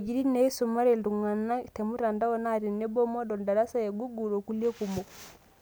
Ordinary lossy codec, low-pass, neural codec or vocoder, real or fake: none; none; none; real